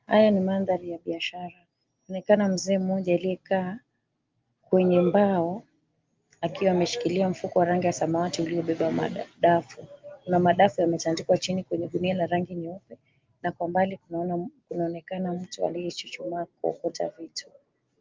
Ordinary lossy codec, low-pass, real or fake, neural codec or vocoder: Opus, 32 kbps; 7.2 kHz; real; none